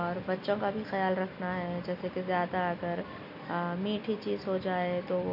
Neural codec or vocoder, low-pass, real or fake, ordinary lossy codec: none; 5.4 kHz; real; none